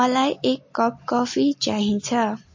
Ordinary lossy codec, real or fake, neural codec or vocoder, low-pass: MP3, 32 kbps; real; none; 7.2 kHz